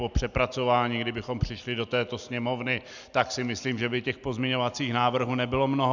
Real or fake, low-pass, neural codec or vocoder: real; 7.2 kHz; none